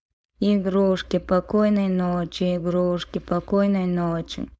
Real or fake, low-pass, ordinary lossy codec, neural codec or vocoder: fake; none; none; codec, 16 kHz, 4.8 kbps, FACodec